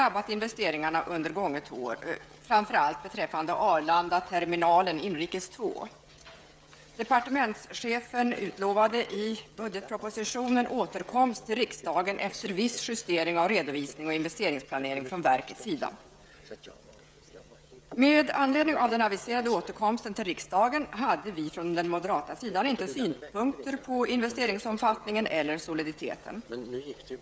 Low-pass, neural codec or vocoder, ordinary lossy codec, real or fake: none; codec, 16 kHz, 16 kbps, FreqCodec, smaller model; none; fake